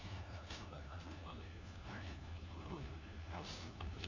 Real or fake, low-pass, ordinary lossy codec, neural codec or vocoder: fake; 7.2 kHz; none; codec, 16 kHz, 1 kbps, FunCodec, trained on LibriTTS, 50 frames a second